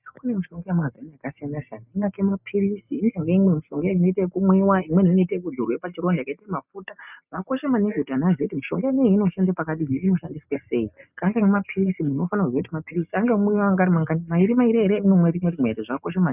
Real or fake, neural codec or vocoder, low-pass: real; none; 3.6 kHz